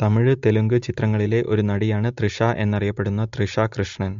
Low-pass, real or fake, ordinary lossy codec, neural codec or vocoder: 7.2 kHz; real; MP3, 48 kbps; none